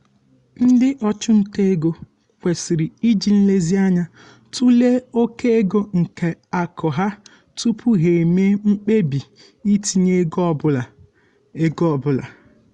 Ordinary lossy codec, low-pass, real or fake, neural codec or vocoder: Opus, 64 kbps; 10.8 kHz; real; none